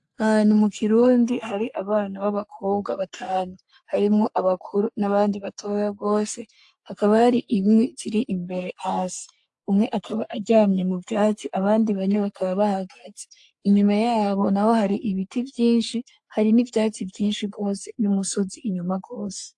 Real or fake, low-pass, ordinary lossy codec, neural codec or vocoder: fake; 10.8 kHz; AAC, 64 kbps; codec, 44.1 kHz, 3.4 kbps, Pupu-Codec